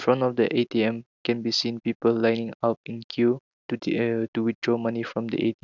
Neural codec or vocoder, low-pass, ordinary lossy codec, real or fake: none; 7.2 kHz; none; real